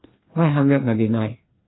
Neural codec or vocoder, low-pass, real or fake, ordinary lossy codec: codec, 16 kHz, 2 kbps, FreqCodec, larger model; 7.2 kHz; fake; AAC, 16 kbps